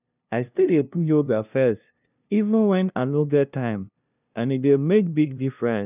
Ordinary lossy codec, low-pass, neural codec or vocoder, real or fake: none; 3.6 kHz; codec, 16 kHz, 0.5 kbps, FunCodec, trained on LibriTTS, 25 frames a second; fake